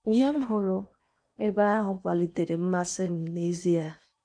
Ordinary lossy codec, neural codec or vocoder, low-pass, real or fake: none; codec, 16 kHz in and 24 kHz out, 0.8 kbps, FocalCodec, streaming, 65536 codes; 9.9 kHz; fake